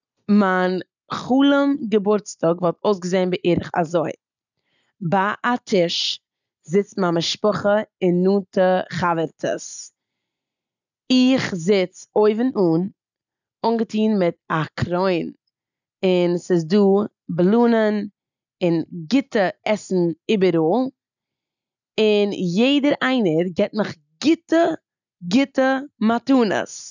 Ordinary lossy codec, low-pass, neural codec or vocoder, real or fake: none; 7.2 kHz; none; real